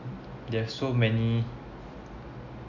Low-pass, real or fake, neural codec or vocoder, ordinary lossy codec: 7.2 kHz; real; none; none